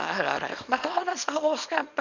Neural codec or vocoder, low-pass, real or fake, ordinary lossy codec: codec, 24 kHz, 0.9 kbps, WavTokenizer, small release; 7.2 kHz; fake; none